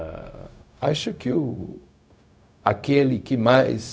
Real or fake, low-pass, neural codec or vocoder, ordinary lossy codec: fake; none; codec, 16 kHz, 0.4 kbps, LongCat-Audio-Codec; none